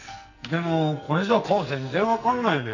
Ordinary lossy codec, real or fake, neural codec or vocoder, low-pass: none; fake; codec, 44.1 kHz, 2.6 kbps, SNAC; 7.2 kHz